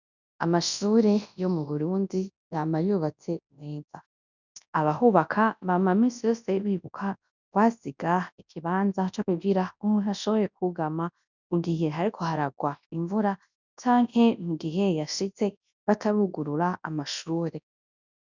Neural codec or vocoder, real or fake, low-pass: codec, 24 kHz, 0.9 kbps, WavTokenizer, large speech release; fake; 7.2 kHz